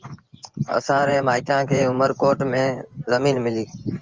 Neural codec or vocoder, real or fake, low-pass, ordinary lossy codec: vocoder, 24 kHz, 100 mel bands, Vocos; fake; 7.2 kHz; Opus, 32 kbps